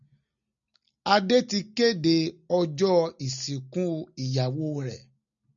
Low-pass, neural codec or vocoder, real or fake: 7.2 kHz; none; real